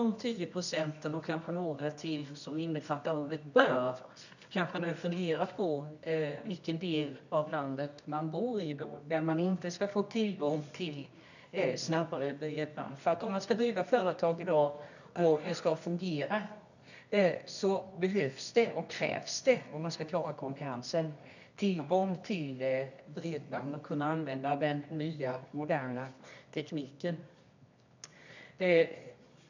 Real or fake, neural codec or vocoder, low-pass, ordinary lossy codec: fake; codec, 24 kHz, 0.9 kbps, WavTokenizer, medium music audio release; 7.2 kHz; none